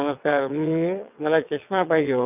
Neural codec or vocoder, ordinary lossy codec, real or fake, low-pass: vocoder, 22.05 kHz, 80 mel bands, WaveNeXt; none; fake; 3.6 kHz